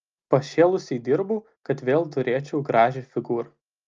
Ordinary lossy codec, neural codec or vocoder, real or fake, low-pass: Opus, 24 kbps; none; real; 7.2 kHz